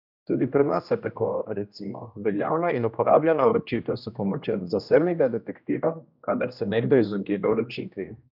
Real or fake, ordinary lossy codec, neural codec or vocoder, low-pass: fake; none; codec, 16 kHz, 1 kbps, X-Codec, HuBERT features, trained on general audio; 5.4 kHz